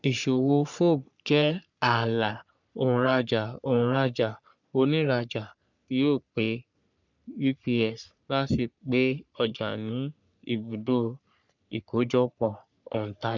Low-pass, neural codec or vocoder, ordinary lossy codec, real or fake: 7.2 kHz; codec, 44.1 kHz, 3.4 kbps, Pupu-Codec; none; fake